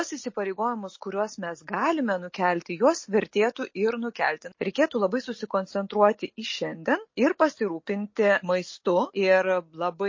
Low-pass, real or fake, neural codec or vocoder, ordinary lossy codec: 7.2 kHz; real; none; MP3, 32 kbps